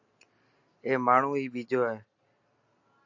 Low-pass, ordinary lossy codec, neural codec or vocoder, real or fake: 7.2 kHz; AAC, 48 kbps; none; real